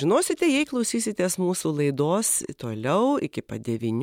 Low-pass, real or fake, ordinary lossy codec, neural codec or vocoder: 19.8 kHz; fake; MP3, 96 kbps; vocoder, 44.1 kHz, 128 mel bands every 256 samples, BigVGAN v2